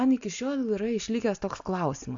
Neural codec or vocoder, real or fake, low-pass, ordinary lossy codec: none; real; 7.2 kHz; AAC, 64 kbps